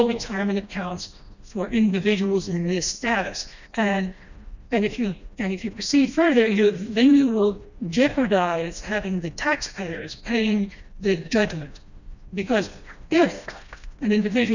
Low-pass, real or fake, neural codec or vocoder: 7.2 kHz; fake; codec, 16 kHz, 1 kbps, FreqCodec, smaller model